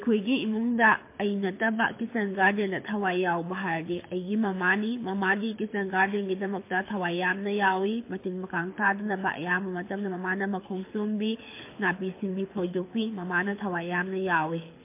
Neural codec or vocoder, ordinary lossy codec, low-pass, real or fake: codec, 24 kHz, 6 kbps, HILCodec; MP3, 24 kbps; 3.6 kHz; fake